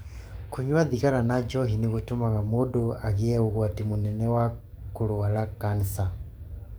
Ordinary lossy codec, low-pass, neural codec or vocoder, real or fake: none; none; codec, 44.1 kHz, 7.8 kbps, DAC; fake